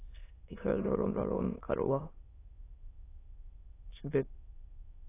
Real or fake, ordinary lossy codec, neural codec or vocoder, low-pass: fake; AAC, 16 kbps; autoencoder, 22.05 kHz, a latent of 192 numbers a frame, VITS, trained on many speakers; 3.6 kHz